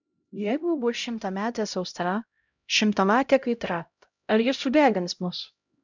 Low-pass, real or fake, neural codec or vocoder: 7.2 kHz; fake; codec, 16 kHz, 0.5 kbps, X-Codec, HuBERT features, trained on LibriSpeech